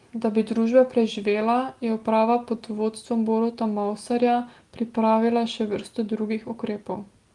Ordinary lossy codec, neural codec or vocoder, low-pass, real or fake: Opus, 24 kbps; none; 10.8 kHz; real